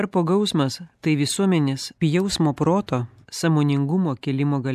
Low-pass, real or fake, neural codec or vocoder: 14.4 kHz; real; none